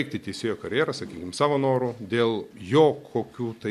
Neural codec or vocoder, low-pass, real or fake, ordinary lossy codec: none; 14.4 kHz; real; MP3, 64 kbps